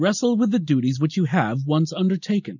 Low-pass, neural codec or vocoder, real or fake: 7.2 kHz; none; real